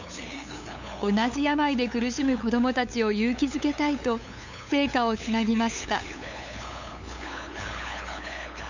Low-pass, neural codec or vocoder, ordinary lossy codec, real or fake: 7.2 kHz; codec, 16 kHz, 8 kbps, FunCodec, trained on LibriTTS, 25 frames a second; none; fake